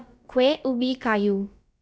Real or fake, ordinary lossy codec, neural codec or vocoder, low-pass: fake; none; codec, 16 kHz, about 1 kbps, DyCAST, with the encoder's durations; none